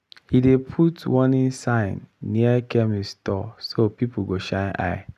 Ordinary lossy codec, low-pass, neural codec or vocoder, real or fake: none; 14.4 kHz; none; real